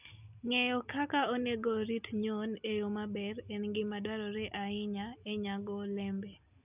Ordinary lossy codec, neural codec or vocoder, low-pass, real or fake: none; none; 3.6 kHz; real